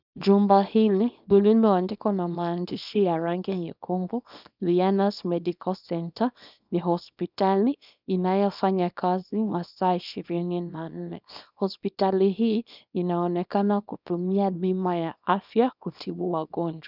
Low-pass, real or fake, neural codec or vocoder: 5.4 kHz; fake; codec, 24 kHz, 0.9 kbps, WavTokenizer, small release